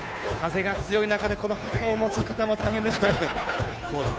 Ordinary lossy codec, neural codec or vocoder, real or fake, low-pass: none; codec, 16 kHz, 2 kbps, FunCodec, trained on Chinese and English, 25 frames a second; fake; none